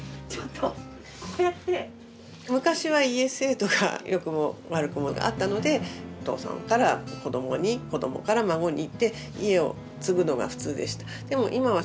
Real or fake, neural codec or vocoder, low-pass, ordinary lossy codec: real; none; none; none